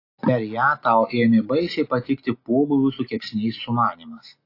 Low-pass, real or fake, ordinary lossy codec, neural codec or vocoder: 5.4 kHz; real; AAC, 32 kbps; none